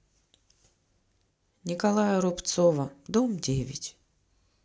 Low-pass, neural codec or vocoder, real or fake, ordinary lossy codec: none; none; real; none